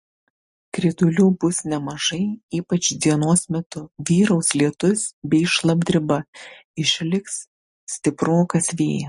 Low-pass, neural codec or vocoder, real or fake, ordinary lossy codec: 14.4 kHz; none; real; MP3, 48 kbps